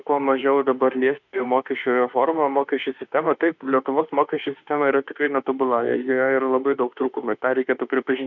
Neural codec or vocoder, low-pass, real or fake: autoencoder, 48 kHz, 32 numbers a frame, DAC-VAE, trained on Japanese speech; 7.2 kHz; fake